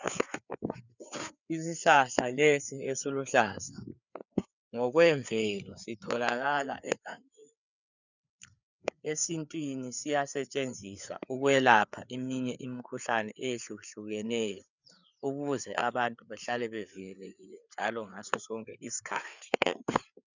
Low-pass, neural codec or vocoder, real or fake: 7.2 kHz; codec, 16 kHz, 4 kbps, FreqCodec, larger model; fake